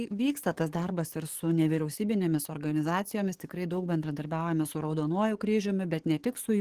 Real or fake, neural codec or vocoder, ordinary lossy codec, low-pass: fake; codec, 44.1 kHz, 7.8 kbps, DAC; Opus, 16 kbps; 14.4 kHz